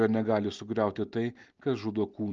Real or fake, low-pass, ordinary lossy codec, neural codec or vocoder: real; 7.2 kHz; Opus, 24 kbps; none